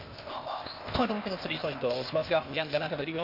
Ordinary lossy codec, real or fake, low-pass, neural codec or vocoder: MP3, 32 kbps; fake; 5.4 kHz; codec, 16 kHz, 0.8 kbps, ZipCodec